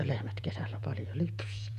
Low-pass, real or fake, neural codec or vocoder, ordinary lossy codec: 14.4 kHz; fake; vocoder, 48 kHz, 128 mel bands, Vocos; none